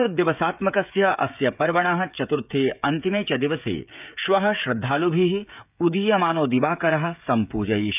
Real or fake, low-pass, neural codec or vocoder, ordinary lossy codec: fake; 3.6 kHz; codec, 16 kHz, 16 kbps, FreqCodec, smaller model; none